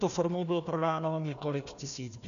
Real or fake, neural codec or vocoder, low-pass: fake; codec, 16 kHz, 1 kbps, FunCodec, trained on Chinese and English, 50 frames a second; 7.2 kHz